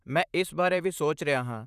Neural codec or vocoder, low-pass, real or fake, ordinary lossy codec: vocoder, 44.1 kHz, 128 mel bands, Pupu-Vocoder; 14.4 kHz; fake; none